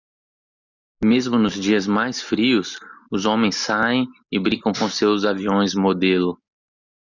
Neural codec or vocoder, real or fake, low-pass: none; real; 7.2 kHz